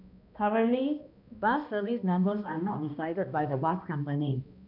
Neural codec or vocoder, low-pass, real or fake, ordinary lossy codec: codec, 16 kHz, 1 kbps, X-Codec, HuBERT features, trained on balanced general audio; 5.4 kHz; fake; none